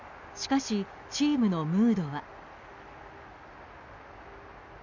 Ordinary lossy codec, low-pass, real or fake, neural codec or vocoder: none; 7.2 kHz; real; none